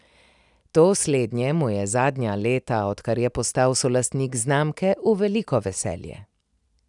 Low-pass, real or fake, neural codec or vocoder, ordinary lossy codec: 10.8 kHz; real; none; none